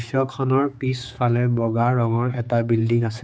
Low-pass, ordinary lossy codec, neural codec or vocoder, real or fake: none; none; codec, 16 kHz, 4 kbps, X-Codec, HuBERT features, trained on general audio; fake